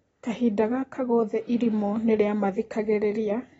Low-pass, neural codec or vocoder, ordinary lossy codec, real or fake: 19.8 kHz; none; AAC, 24 kbps; real